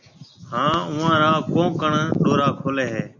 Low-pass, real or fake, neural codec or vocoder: 7.2 kHz; real; none